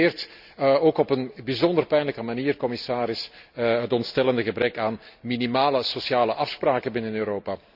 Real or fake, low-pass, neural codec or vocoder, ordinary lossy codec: real; 5.4 kHz; none; none